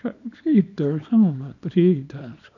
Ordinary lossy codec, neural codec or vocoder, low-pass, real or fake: none; codec, 24 kHz, 0.9 kbps, WavTokenizer, small release; 7.2 kHz; fake